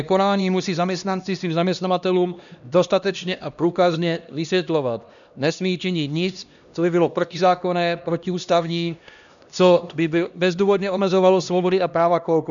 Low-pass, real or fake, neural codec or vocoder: 7.2 kHz; fake; codec, 16 kHz, 1 kbps, X-Codec, HuBERT features, trained on LibriSpeech